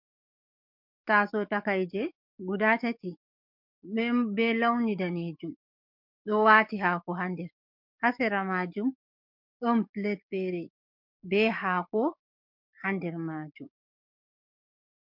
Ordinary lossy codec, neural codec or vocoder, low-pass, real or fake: MP3, 48 kbps; codec, 44.1 kHz, 7.8 kbps, DAC; 5.4 kHz; fake